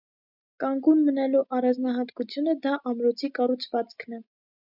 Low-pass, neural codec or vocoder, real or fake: 5.4 kHz; none; real